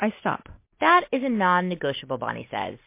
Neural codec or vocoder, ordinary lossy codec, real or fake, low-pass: none; MP3, 24 kbps; real; 3.6 kHz